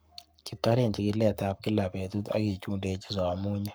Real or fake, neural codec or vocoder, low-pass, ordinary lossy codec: fake; codec, 44.1 kHz, 7.8 kbps, Pupu-Codec; none; none